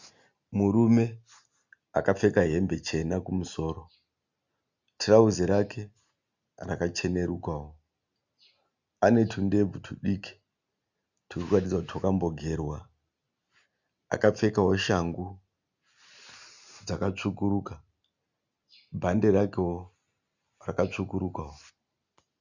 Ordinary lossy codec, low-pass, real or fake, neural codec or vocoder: Opus, 64 kbps; 7.2 kHz; real; none